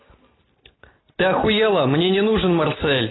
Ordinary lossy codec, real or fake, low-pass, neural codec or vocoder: AAC, 16 kbps; real; 7.2 kHz; none